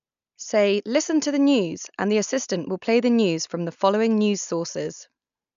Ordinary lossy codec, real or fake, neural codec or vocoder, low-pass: none; real; none; 7.2 kHz